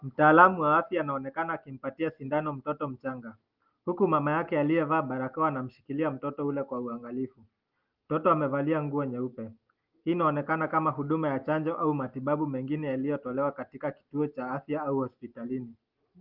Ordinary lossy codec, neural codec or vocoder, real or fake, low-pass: Opus, 32 kbps; none; real; 5.4 kHz